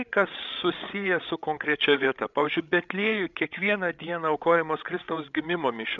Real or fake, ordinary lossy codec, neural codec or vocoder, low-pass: fake; MP3, 96 kbps; codec, 16 kHz, 16 kbps, FreqCodec, larger model; 7.2 kHz